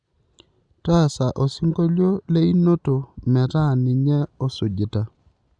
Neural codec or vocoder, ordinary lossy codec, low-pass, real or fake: none; Opus, 64 kbps; 9.9 kHz; real